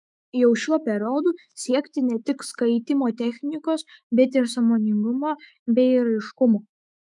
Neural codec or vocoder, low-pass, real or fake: autoencoder, 48 kHz, 128 numbers a frame, DAC-VAE, trained on Japanese speech; 10.8 kHz; fake